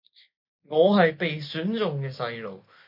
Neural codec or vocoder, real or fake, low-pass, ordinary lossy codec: none; real; 5.4 kHz; MP3, 32 kbps